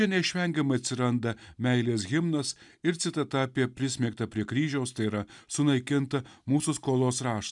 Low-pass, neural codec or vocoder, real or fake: 10.8 kHz; none; real